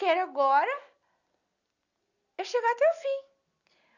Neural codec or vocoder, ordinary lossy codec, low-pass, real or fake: none; none; 7.2 kHz; real